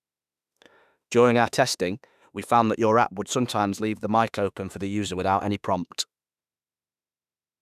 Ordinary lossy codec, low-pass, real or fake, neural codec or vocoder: none; 14.4 kHz; fake; autoencoder, 48 kHz, 32 numbers a frame, DAC-VAE, trained on Japanese speech